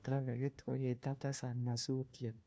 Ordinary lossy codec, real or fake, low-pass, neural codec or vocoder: none; fake; none; codec, 16 kHz, 1 kbps, FunCodec, trained on LibriTTS, 50 frames a second